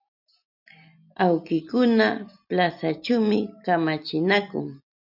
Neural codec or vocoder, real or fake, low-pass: none; real; 5.4 kHz